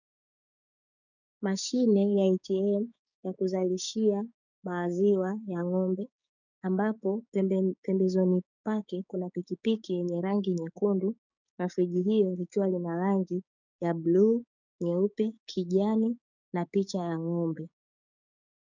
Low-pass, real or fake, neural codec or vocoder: 7.2 kHz; fake; codec, 16 kHz, 6 kbps, DAC